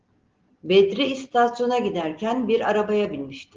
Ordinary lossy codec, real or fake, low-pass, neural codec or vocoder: Opus, 16 kbps; real; 7.2 kHz; none